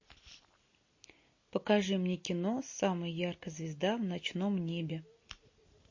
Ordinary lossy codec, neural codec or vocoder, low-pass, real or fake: MP3, 32 kbps; none; 7.2 kHz; real